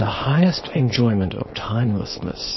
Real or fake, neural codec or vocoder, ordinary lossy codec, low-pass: fake; codec, 24 kHz, 0.9 kbps, WavTokenizer, small release; MP3, 24 kbps; 7.2 kHz